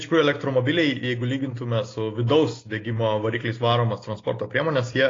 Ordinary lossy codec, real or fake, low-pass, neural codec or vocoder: AAC, 32 kbps; real; 7.2 kHz; none